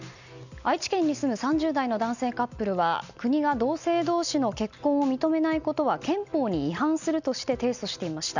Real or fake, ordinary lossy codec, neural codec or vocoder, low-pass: real; none; none; 7.2 kHz